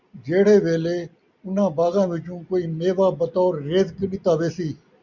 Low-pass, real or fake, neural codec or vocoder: 7.2 kHz; real; none